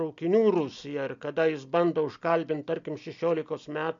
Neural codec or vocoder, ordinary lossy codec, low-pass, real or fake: none; MP3, 64 kbps; 7.2 kHz; real